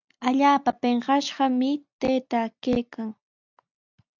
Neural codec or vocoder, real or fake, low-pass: none; real; 7.2 kHz